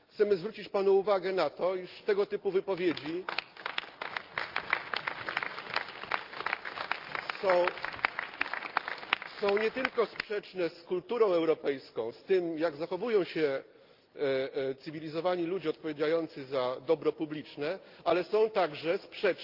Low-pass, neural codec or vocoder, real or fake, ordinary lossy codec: 5.4 kHz; none; real; Opus, 32 kbps